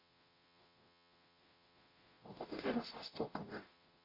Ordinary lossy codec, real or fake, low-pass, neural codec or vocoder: AAC, 24 kbps; fake; 5.4 kHz; codec, 44.1 kHz, 0.9 kbps, DAC